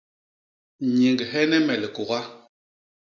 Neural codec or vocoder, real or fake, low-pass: none; real; 7.2 kHz